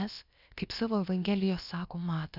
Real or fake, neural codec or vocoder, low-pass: fake; codec, 16 kHz, about 1 kbps, DyCAST, with the encoder's durations; 5.4 kHz